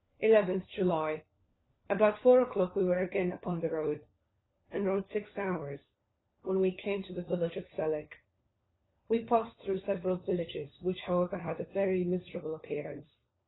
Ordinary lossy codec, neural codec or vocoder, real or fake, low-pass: AAC, 16 kbps; codec, 16 kHz, 4 kbps, FunCodec, trained on LibriTTS, 50 frames a second; fake; 7.2 kHz